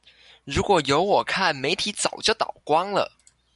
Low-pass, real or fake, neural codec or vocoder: 10.8 kHz; real; none